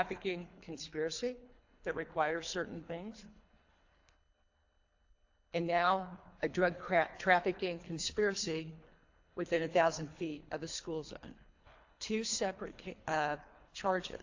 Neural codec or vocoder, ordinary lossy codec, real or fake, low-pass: codec, 24 kHz, 3 kbps, HILCodec; AAC, 48 kbps; fake; 7.2 kHz